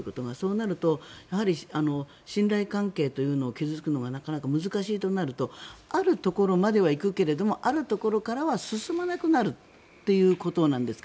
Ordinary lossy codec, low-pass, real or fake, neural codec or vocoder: none; none; real; none